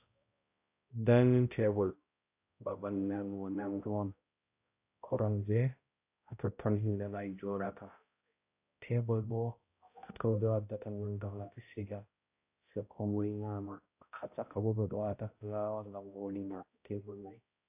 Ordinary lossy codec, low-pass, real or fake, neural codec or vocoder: AAC, 32 kbps; 3.6 kHz; fake; codec, 16 kHz, 0.5 kbps, X-Codec, HuBERT features, trained on balanced general audio